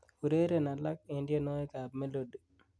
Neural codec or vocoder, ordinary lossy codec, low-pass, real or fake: none; none; none; real